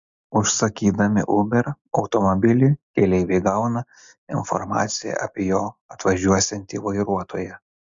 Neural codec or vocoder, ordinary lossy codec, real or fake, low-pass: none; MP3, 64 kbps; real; 7.2 kHz